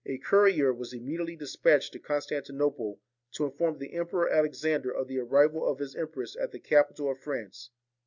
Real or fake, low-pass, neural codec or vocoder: real; 7.2 kHz; none